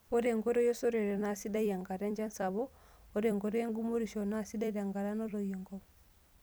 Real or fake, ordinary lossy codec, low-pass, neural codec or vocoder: fake; none; none; vocoder, 44.1 kHz, 128 mel bands every 256 samples, BigVGAN v2